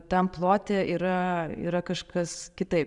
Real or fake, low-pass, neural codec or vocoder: fake; 10.8 kHz; vocoder, 44.1 kHz, 128 mel bands every 512 samples, BigVGAN v2